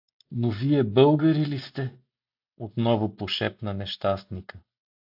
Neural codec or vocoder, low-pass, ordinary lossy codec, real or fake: none; 5.4 kHz; AAC, 48 kbps; real